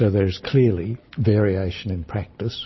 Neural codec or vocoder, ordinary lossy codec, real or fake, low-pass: none; MP3, 24 kbps; real; 7.2 kHz